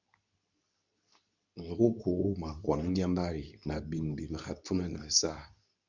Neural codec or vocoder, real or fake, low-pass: codec, 24 kHz, 0.9 kbps, WavTokenizer, medium speech release version 2; fake; 7.2 kHz